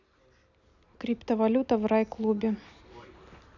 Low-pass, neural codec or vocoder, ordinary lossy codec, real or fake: 7.2 kHz; none; none; real